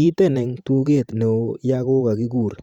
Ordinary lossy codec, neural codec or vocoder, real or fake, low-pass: Opus, 64 kbps; vocoder, 44.1 kHz, 128 mel bands every 512 samples, BigVGAN v2; fake; 19.8 kHz